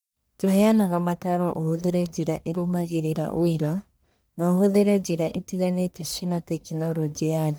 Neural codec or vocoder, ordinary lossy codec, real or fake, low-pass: codec, 44.1 kHz, 1.7 kbps, Pupu-Codec; none; fake; none